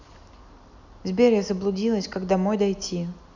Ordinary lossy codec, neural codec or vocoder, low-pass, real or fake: none; none; 7.2 kHz; real